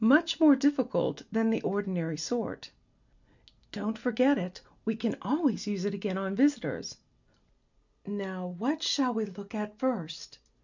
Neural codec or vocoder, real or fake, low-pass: none; real; 7.2 kHz